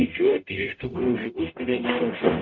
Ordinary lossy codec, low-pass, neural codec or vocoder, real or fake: MP3, 64 kbps; 7.2 kHz; codec, 44.1 kHz, 0.9 kbps, DAC; fake